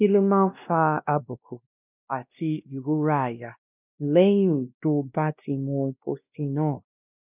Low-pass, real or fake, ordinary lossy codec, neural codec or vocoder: 3.6 kHz; fake; none; codec, 16 kHz, 1 kbps, X-Codec, WavLM features, trained on Multilingual LibriSpeech